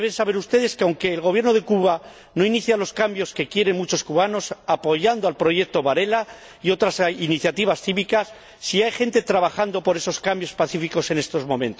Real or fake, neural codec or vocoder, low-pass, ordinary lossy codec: real; none; none; none